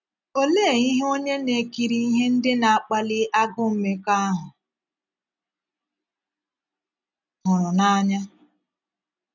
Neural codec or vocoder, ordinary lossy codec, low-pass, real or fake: none; none; 7.2 kHz; real